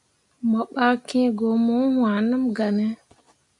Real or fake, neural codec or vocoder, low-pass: real; none; 10.8 kHz